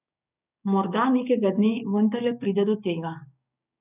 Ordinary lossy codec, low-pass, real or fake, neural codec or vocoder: none; 3.6 kHz; fake; codec, 16 kHz, 6 kbps, DAC